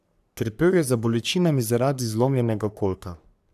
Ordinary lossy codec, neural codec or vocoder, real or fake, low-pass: AAC, 96 kbps; codec, 44.1 kHz, 3.4 kbps, Pupu-Codec; fake; 14.4 kHz